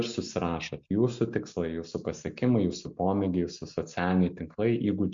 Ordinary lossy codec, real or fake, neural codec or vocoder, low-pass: MP3, 48 kbps; real; none; 7.2 kHz